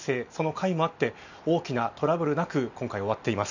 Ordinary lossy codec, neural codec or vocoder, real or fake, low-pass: none; none; real; 7.2 kHz